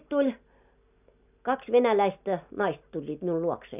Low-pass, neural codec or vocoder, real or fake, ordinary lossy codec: 3.6 kHz; none; real; none